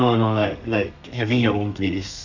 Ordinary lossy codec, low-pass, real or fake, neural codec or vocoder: none; 7.2 kHz; fake; codec, 32 kHz, 1.9 kbps, SNAC